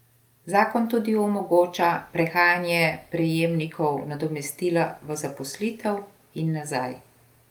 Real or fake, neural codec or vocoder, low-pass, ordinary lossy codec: real; none; 19.8 kHz; Opus, 32 kbps